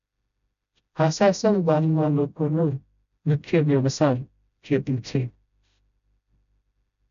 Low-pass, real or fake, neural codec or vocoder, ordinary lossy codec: 7.2 kHz; fake; codec, 16 kHz, 0.5 kbps, FreqCodec, smaller model; none